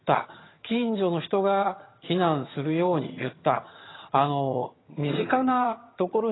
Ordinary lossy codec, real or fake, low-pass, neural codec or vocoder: AAC, 16 kbps; fake; 7.2 kHz; vocoder, 22.05 kHz, 80 mel bands, HiFi-GAN